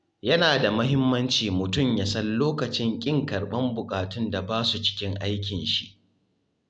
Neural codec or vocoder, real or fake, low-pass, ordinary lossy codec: none; real; 9.9 kHz; none